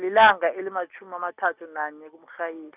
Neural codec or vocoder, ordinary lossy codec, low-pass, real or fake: none; none; 3.6 kHz; real